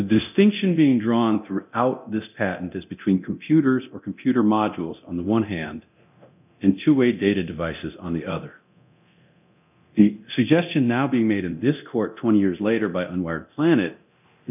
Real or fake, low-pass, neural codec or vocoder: fake; 3.6 kHz; codec, 24 kHz, 0.9 kbps, DualCodec